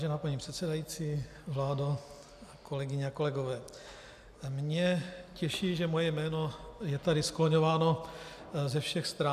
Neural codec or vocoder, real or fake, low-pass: none; real; 14.4 kHz